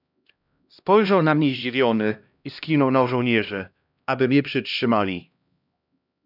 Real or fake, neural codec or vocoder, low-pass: fake; codec, 16 kHz, 1 kbps, X-Codec, HuBERT features, trained on LibriSpeech; 5.4 kHz